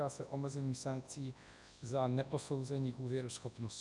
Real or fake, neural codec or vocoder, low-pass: fake; codec, 24 kHz, 0.9 kbps, WavTokenizer, large speech release; 10.8 kHz